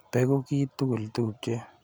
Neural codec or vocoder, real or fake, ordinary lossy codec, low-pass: none; real; none; none